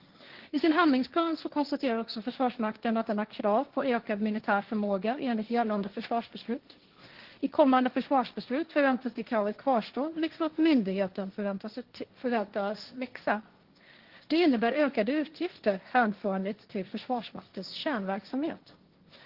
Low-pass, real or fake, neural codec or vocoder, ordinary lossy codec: 5.4 kHz; fake; codec, 16 kHz, 1.1 kbps, Voila-Tokenizer; Opus, 16 kbps